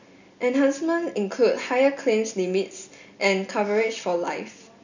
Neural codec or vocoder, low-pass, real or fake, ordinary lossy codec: none; 7.2 kHz; real; none